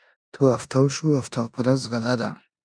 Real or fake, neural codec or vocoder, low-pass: fake; codec, 16 kHz in and 24 kHz out, 0.9 kbps, LongCat-Audio-Codec, four codebook decoder; 9.9 kHz